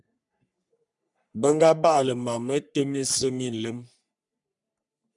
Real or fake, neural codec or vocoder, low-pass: fake; codec, 44.1 kHz, 3.4 kbps, Pupu-Codec; 10.8 kHz